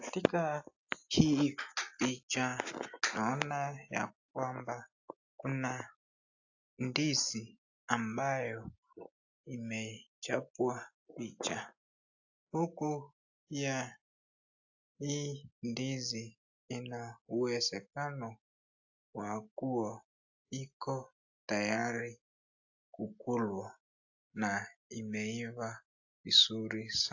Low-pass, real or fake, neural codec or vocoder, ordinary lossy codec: 7.2 kHz; real; none; AAC, 48 kbps